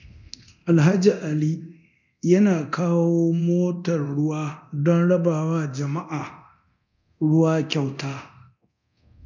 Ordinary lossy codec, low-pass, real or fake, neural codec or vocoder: none; 7.2 kHz; fake; codec, 24 kHz, 0.9 kbps, DualCodec